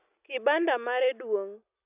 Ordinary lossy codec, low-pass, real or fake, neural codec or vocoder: none; 3.6 kHz; real; none